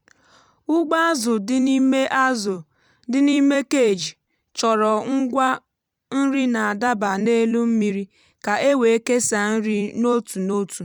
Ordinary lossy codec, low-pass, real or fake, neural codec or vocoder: none; 19.8 kHz; fake; vocoder, 44.1 kHz, 128 mel bands every 256 samples, BigVGAN v2